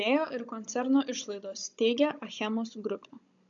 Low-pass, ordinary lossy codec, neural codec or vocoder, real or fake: 7.2 kHz; MP3, 48 kbps; codec, 16 kHz, 16 kbps, FunCodec, trained on Chinese and English, 50 frames a second; fake